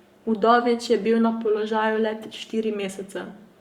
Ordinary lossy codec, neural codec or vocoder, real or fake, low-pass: Opus, 64 kbps; codec, 44.1 kHz, 7.8 kbps, Pupu-Codec; fake; 19.8 kHz